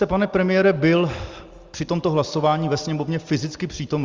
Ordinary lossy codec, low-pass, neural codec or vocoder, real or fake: Opus, 32 kbps; 7.2 kHz; none; real